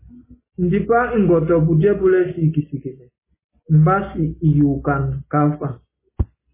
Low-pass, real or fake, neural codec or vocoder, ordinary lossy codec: 3.6 kHz; real; none; MP3, 16 kbps